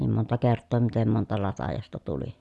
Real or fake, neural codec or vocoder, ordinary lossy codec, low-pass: real; none; none; none